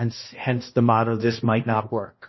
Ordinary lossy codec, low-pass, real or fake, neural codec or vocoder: MP3, 24 kbps; 7.2 kHz; fake; codec, 16 kHz, 1 kbps, X-Codec, HuBERT features, trained on balanced general audio